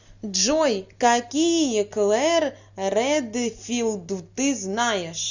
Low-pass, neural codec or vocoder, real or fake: 7.2 kHz; none; real